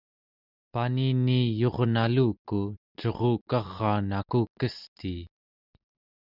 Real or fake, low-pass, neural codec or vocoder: real; 5.4 kHz; none